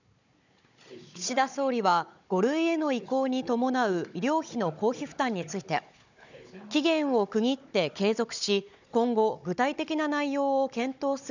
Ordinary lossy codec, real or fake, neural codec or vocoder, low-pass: none; fake; codec, 16 kHz, 16 kbps, FunCodec, trained on Chinese and English, 50 frames a second; 7.2 kHz